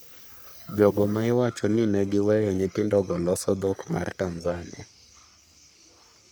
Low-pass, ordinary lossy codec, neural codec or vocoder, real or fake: none; none; codec, 44.1 kHz, 3.4 kbps, Pupu-Codec; fake